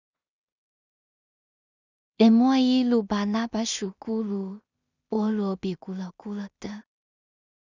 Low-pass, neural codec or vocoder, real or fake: 7.2 kHz; codec, 16 kHz in and 24 kHz out, 0.4 kbps, LongCat-Audio-Codec, two codebook decoder; fake